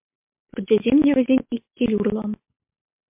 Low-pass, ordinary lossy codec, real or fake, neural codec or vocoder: 3.6 kHz; MP3, 32 kbps; real; none